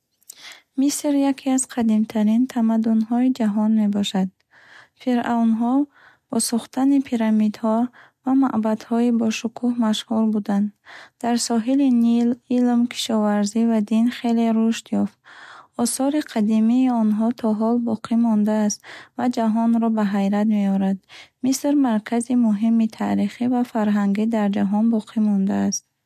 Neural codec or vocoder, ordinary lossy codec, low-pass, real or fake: none; MP3, 64 kbps; 14.4 kHz; real